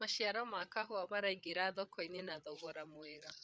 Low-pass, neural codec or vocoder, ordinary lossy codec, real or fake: none; codec, 16 kHz, 8 kbps, FreqCodec, larger model; none; fake